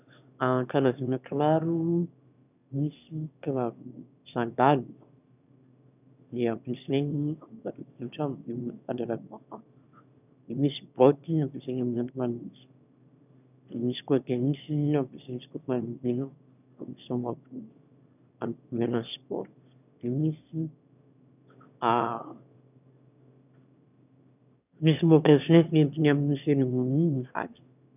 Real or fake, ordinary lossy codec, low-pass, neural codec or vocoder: fake; none; 3.6 kHz; autoencoder, 22.05 kHz, a latent of 192 numbers a frame, VITS, trained on one speaker